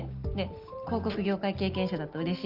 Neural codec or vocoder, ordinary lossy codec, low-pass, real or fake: none; Opus, 32 kbps; 5.4 kHz; real